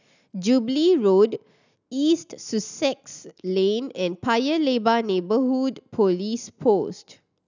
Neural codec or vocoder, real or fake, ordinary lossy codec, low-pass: none; real; none; 7.2 kHz